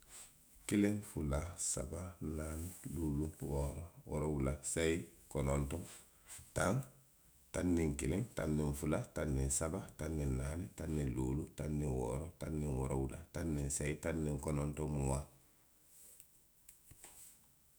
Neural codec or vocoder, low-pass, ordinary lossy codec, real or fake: autoencoder, 48 kHz, 128 numbers a frame, DAC-VAE, trained on Japanese speech; none; none; fake